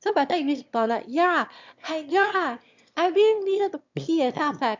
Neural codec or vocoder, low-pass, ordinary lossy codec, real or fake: autoencoder, 22.05 kHz, a latent of 192 numbers a frame, VITS, trained on one speaker; 7.2 kHz; MP3, 64 kbps; fake